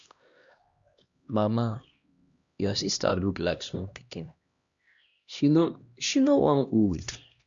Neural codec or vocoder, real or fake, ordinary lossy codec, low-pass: codec, 16 kHz, 1 kbps, X-Codec, HuBERT features, trained on LibriSpeech; fake; Opus, 64 kbps; 7.2 kHz